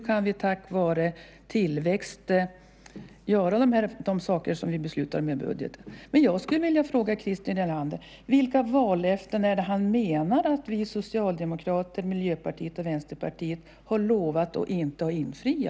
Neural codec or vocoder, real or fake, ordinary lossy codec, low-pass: none; real; none; none